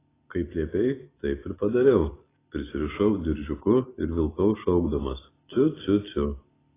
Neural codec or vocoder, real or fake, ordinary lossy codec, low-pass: none; real; AAC, 16 kbps; 3.6 kHz